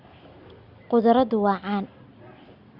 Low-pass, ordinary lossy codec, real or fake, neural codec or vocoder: 5.4 kHz; Opus, 64 kbps; real; none